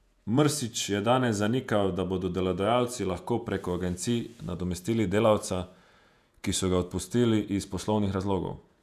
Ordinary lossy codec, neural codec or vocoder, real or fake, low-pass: none; vocoder, 48 kHz, 128 mel bands, Vocos; fake; 14.4 kHz